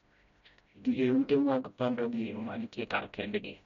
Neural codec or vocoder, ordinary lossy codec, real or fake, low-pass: codec, 16 kHz, 0.5 kbps, FreqCodec, smaller model; MP3, 64 kbps; fake; 7.2 kHz